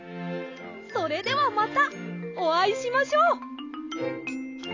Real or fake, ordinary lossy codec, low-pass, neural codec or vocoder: real; AAC, 32 kbps; 7.2 kHz; none